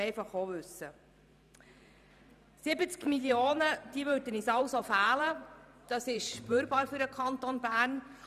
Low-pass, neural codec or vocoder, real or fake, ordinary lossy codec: 14.4 kHz; vocoder, 44.1 kHz, 128 mel bands every 512 samples, BigVGAN v2; fake; none